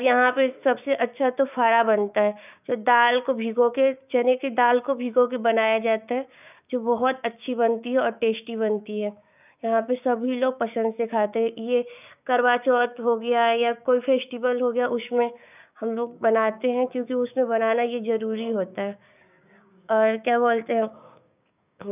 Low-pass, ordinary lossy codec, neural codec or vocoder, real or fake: 3.6 kHz; none; codec, 16 kHz, 6 kbps, DAC; fake